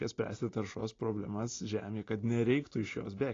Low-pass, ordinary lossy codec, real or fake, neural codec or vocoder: 7.2 kHz; AAC, 32 kbps; real; none